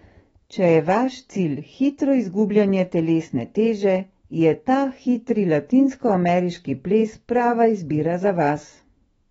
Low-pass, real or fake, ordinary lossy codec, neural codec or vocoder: 19.8 kHz; fake; AAC, 24 kbps; autoencoder, 48 kHz, 128 numbers a frame, DAC-VAE, trained on Japanese speech